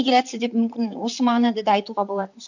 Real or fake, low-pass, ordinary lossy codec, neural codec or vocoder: fake; 7.2 kHz; none; vocoder, 44.1 kHz, 128 mel bands, Pupu-Vocoder